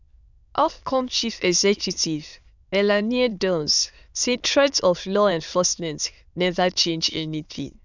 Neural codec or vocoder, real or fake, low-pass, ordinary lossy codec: autoencoder, 22.05 kHz, a latent of 192 numbers a frame, VITS, trained on many speakers; fake; 7.2 kHz; none